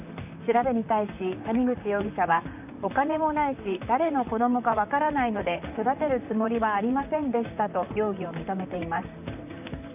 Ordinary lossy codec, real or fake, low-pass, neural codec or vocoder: none; fake; 3.6 kHz; vocoder, 44.1 kHz, 128 mel bands, Pupu-Vocoder